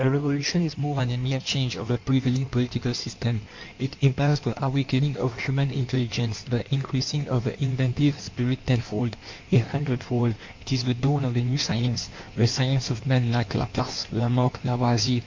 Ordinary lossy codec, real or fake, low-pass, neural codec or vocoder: MP3, 48 kbps; fake; 7.2 kHz; codec, 16 kHz in and 24 kHz out, 1.1 kbps, FireRedTTS-2 codec